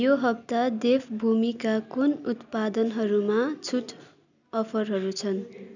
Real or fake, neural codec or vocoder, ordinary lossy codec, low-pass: real; none; none; 7.2 kHz